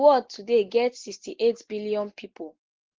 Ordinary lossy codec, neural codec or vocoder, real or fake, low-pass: Opus, 16 kbps; none; real; 7.2 kHz